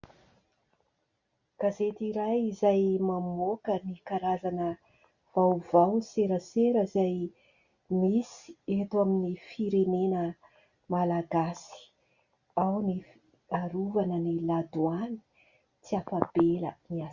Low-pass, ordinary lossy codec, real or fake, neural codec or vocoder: 7.2 kHz; Opus, 64 kbps; real; none